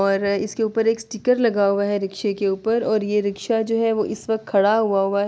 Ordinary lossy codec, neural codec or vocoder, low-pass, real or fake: none; none; none; real